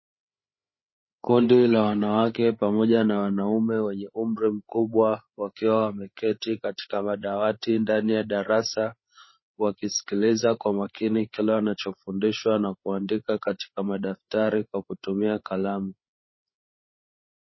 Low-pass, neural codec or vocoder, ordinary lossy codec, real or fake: 7.2 kHz; codec, 16 kHz, 8 kbps, FreqCodec, larger model; MP3, 24 kbps; fake